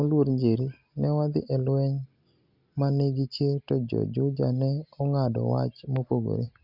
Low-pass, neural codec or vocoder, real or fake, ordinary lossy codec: 5.4 kHz; none; real; Opus, 64 kbps